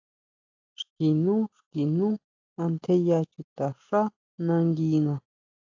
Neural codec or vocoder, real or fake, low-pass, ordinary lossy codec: none; real; 7.2 kHz; AAC, 48 kbps